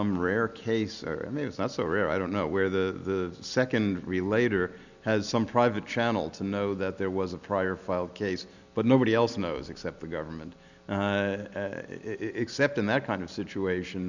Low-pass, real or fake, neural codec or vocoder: 7.2 kHz; real; none